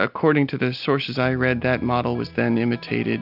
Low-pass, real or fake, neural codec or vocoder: 5.4 kHz; real; none